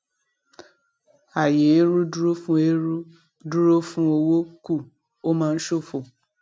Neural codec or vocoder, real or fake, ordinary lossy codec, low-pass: none; real; none; none